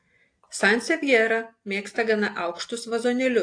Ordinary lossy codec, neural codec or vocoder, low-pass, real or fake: AAC, 48 kbps; none; 9.9 kHz; real